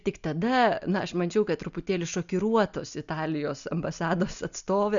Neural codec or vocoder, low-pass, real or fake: none; 7.2 kHz; real